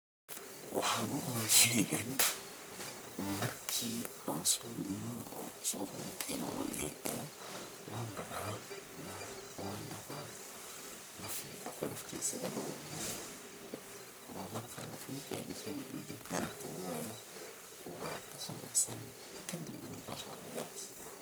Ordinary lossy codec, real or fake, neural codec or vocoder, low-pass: none; fake; codec, 44.1 kHz, 1.7 kbps, Pupu-Codec; none